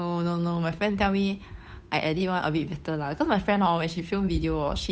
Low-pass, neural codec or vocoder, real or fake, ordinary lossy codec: none; codec, 16 kHz, 8 kbps, FunCodec, trained on Chinese and English, 25 frames a second; fake; none